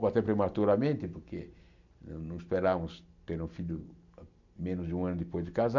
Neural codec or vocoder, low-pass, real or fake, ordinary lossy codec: none; 7.2 kHz; real; none